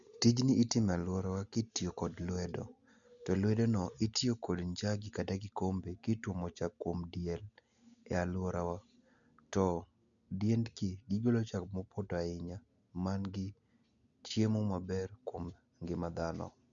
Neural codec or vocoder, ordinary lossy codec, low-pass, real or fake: none; none; 7.2 kHz; real